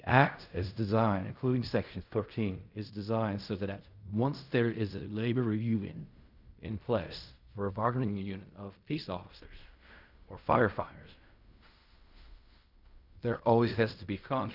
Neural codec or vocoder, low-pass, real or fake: codec, 16 kHz in and 24 kHz out, 0.4 kbps, LongCat-Audio-Codec, fine tuned four codebook decoder; 5.4 kHz; fake